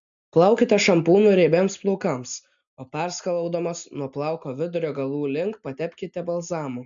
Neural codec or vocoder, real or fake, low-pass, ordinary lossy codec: none; real; 7.2 kHz; MP3, 64 kbps